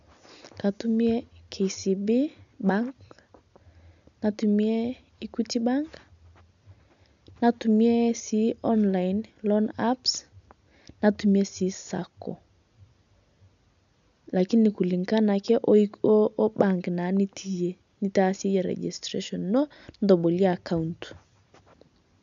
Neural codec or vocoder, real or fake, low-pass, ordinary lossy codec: none; real; 7.2 kHz; none